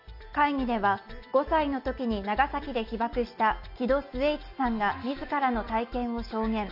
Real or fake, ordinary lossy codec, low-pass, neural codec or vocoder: real; none; 5.4 kHz; none